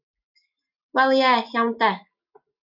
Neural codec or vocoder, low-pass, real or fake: none; 5.4 kHz; real